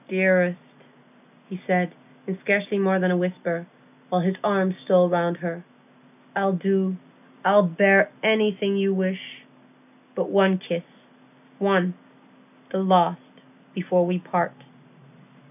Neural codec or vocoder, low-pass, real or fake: none; 3.6 kHz; real